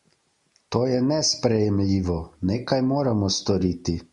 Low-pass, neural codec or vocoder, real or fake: 10.8 kHz; none; real